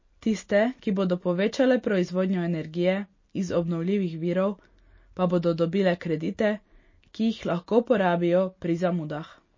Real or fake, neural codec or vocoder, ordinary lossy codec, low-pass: real; none; MP3, 32 kbps; 7.2 kHz